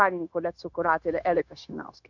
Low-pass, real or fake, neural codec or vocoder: 7.2 kHz; fake; codec, 16 kHz, 0.9 kbps, LongCat-Audio-Codec